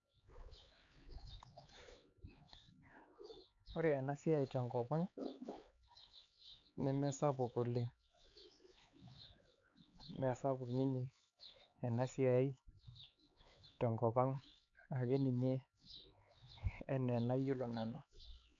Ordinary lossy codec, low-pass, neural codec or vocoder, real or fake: AAC, 64 kbps; 7.2 kHz; codec, 16 kHz, 4 kbps, X-Codec, HuBERT features, trained on LibriSpeech; fake